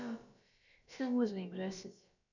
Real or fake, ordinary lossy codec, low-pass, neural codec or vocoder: fake; none; 7.2 kHz; codec, 16 kHz, about 1 kbps, DyCAST, with the encoder's durations